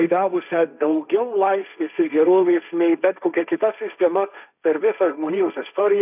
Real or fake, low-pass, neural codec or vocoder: fake; 3.6 kHz; codec, 16 kHz, 1.1 kbps, Voila-Tokenizer